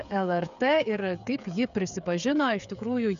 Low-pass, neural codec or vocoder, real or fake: 7.2 kHz; codec, 16 kHz, 8 kbps, FreqCodec, smaller model; fake